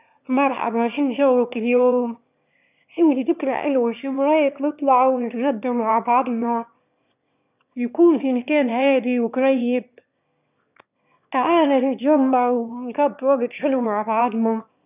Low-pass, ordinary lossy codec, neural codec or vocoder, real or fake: 3.6 kHz; none; autoencoder, 22.05 kHz, a latent of 192 numbers a frame, VITS, trained on one speaker; fake